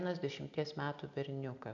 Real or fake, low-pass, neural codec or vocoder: real; 7.2 kHz; none